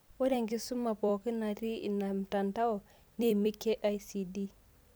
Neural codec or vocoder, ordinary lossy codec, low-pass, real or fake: vocoder, 44.1 kHz, 128 mel bands every 256 samples, BigVGAN v2; none; none; fake